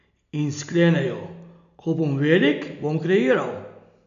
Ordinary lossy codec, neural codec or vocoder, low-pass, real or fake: none; none; 7.2 kHz; real